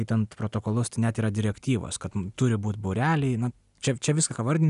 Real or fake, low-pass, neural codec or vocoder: real; 10.8 kHz; none